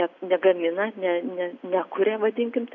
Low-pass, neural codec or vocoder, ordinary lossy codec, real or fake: 7.2 kHz; none; AAC, 48 kbps; real